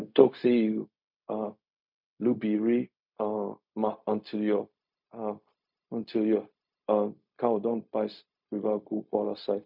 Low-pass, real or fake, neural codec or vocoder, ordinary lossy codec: 5.4 kHz; fake; codec, 16 kHz, 0.4 kbps, LongCat-Audio-Codec; MP3, 48 kbps